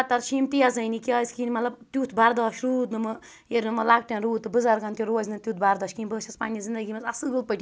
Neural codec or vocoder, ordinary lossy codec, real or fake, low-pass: none; none; real; none